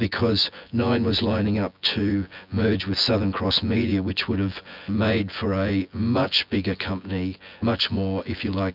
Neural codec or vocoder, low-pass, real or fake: vocoder, 24 kHz, 100 mel bands, Vocos; 5.4 kHz; fake